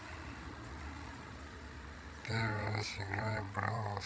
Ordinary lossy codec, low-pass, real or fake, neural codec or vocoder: none; none; fake; codec, 16 kHz, 16 kbps, FreqCodec, larger model